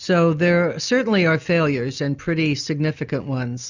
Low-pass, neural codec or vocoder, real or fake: 7.2 kHz; none; real